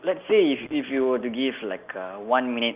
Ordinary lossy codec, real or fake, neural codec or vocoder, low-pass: Opus, 32 kbps; real; none; 3.6 kHz